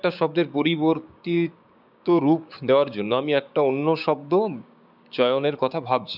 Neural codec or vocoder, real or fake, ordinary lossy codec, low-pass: codec, 16 kHz, 6 kbps, DAC; fake; none; 5.4 kHz